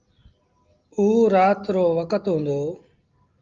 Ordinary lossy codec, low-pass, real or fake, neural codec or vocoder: Opus, 32 kbps; 7.2 kHz; real; none